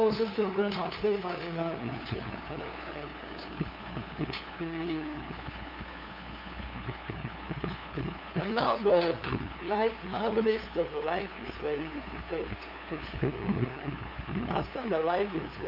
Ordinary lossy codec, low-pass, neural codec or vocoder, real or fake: none; 5.4 kHz; codec, 16 kHz, 2 kbps, FunCodec, trained on LibriTTS, 25 frames a second; fake